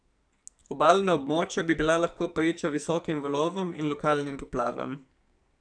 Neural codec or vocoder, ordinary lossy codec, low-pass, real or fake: codec, 44.1 kHz, 2.6 kbps, SNAC; none; 9.9 kHz; fake